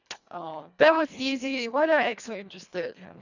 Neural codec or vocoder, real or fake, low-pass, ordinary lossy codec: codec, 24 kHz, 1.5 kbps, HILCodec; fake; 7.2 kHz; none